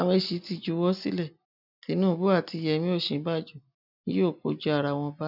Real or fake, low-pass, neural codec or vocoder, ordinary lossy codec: real; 5.4 kHz; none; none